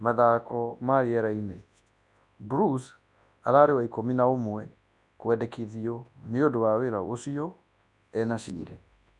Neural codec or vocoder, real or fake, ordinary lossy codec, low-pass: codec, 24 kHz, 0.9 kbps, WavTokenizer, large speech release; fake; none; 10.8 kHz